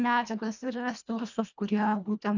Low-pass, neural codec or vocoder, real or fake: 7.2 kHz; codec, 24 kHz, 1.5 kbps, HILCodec; fake